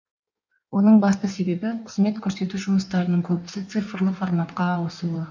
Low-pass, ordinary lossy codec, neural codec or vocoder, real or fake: 7.2 kHz; none; codec, 16 kHz in and 24 kHz out, 1.1 kbps, FireRedTTS-2 codec; fake